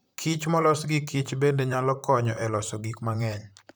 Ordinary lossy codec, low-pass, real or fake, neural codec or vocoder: none; none; fake; vocoder, 44.1 kHz, 128 mel bands, Pupu-Vocoder